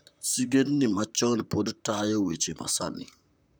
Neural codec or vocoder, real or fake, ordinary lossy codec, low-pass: vocoder, 44.1 kHz, 128 mel bands, Pupu-Vocoder; fake; none; none